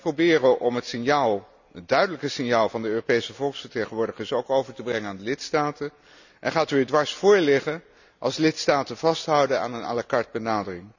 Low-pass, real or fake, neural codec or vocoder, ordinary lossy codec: 7.2 kHz; real; none; none